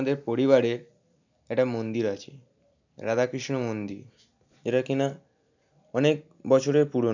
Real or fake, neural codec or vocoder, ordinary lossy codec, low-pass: real; none; none; 7.2 kHz